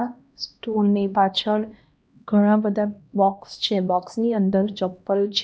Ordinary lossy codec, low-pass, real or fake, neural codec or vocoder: none; none; fake; codec, 16 kHz, 1 kbps, X-Codec, HuBERT features, trained on LibriSpeech